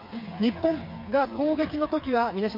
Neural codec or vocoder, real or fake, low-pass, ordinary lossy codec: codec, 16 kHz, 4 kbps, FreqCodec, smaller model; fake; 5.4 kHz; MP3, 32 kbps